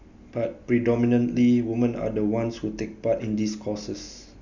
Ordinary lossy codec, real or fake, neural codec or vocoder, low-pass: none; real; none; 7.2 kHz